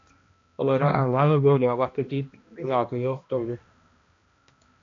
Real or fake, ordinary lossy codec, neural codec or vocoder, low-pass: fake; AAC, 48 kbps; codec, 16 kHz, 1 kbps, X-Codec, HuBERT features, trained on balanced general audio; 7.2 kHz